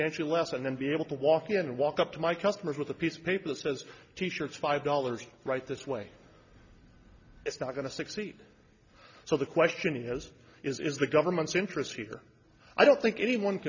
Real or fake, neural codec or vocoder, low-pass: real; none; 7.2 kHz